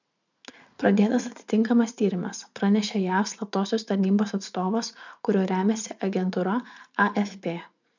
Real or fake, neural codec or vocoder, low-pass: fake; vocoder, 44.1 kHz, 80 mel bands, Vocos; 7.2 kHz